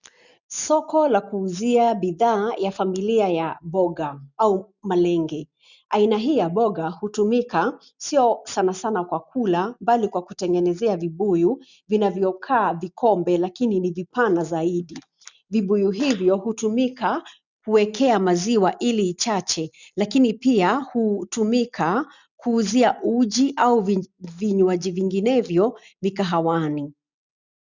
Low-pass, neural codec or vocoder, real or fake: 7.2 kHz; none; real